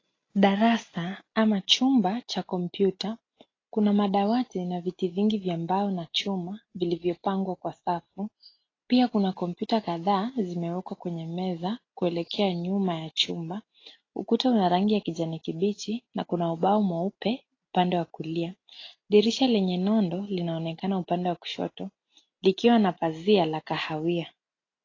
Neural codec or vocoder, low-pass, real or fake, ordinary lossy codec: none; 7.2 kHz; real; AAC, 32 kbps